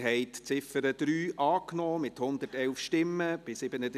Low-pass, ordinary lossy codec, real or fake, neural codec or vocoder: 14.4 kHz; none; real; none